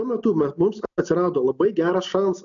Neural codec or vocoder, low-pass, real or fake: none; 7.2 kHz; real